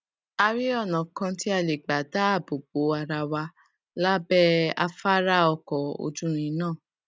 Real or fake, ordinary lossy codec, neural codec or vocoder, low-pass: real; none; none; none